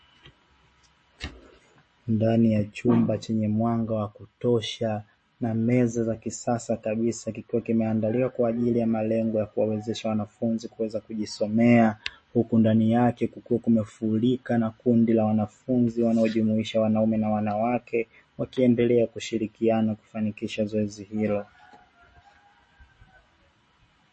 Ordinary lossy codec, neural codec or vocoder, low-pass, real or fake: MP3, 32 kbps; none; 10.8 kHz; real